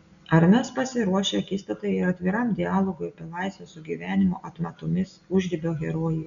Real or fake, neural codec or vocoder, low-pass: real; none; 7.2 kHz